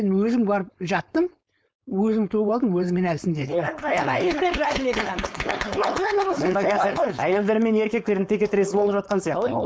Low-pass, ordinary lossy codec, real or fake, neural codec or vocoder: none; none; fake; codec, 16 kHz, 4.8 kbps, FACodec